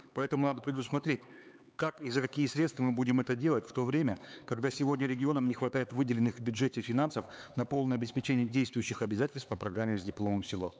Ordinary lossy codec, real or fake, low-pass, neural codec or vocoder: none; fake; none; codec, 16 kHz, 4 kbps, X-Codec, HuBERT features, trained on LibriSpeech